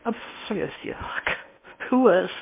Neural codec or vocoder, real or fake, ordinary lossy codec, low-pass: codec, 16 kHz in and 24 kHz out, 0.8 kbps, FocalCodec, streaming, 65536 codes; fake; MP3, 24 kbps; 3.6 kHz